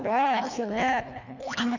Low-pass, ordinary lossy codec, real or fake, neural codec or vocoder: 7.2 kHz; none; fake; codec, 24 kHz, 1.5 kbps, HILCodec